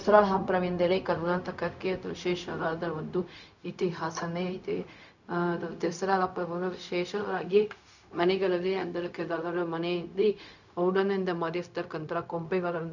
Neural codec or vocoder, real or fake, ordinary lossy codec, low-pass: codec, 16 kHz, 0.4 kbps, LongCat-Audio-Codec; fake; none; 7.2 kHz